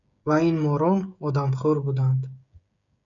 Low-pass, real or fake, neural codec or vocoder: 7.2 kHz; fake; codec, 16 kHz, 16 kbps, FreqCodec, smaller model